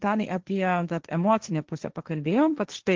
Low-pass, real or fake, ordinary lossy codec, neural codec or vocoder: 7.2 kHz; fake; Opus, 16 kbps; codec, 16 kHz, 1.1 kbps, Voila-Tokenizer